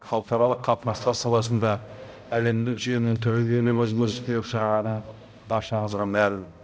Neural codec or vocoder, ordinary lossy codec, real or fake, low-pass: codec, 16 kHz, 0.5 kbps, X-Codec, HuBERT features, trained on balanced general audio; none; fake; none